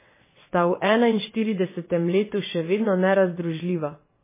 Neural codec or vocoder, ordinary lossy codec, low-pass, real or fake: vocoder, 22.05 kHz, 80 mel bands, WaveNeXt; MP3, 16 kbps; 3.6 kHz; fake